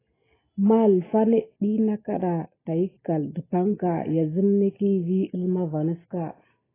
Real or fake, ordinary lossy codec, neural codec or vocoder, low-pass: real; AAC, 16 kbps; none; 3.6 kHz